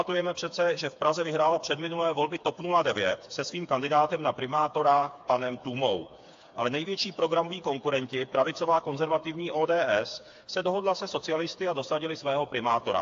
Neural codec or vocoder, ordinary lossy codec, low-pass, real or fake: codec, 16 kHz, 4 kbps, FreqCodec, smaller model; AAC, 48 kbps; 7.2 kHz; fake